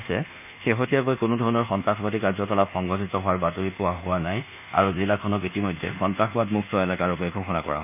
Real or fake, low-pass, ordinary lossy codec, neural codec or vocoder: fake; 3.6 kHz; none; codec, 24 kHz, 1.2 kbps, DualCodec